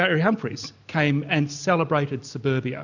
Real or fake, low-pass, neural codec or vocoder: real; 7.2 kHz; none